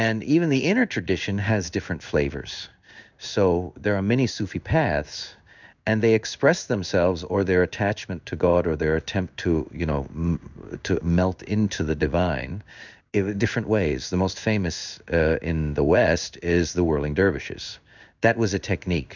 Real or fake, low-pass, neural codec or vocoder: fake; 7.2 kHz; codec, 16 kHz in and 24 kHz out, 1 kbps, XY-Tokenizer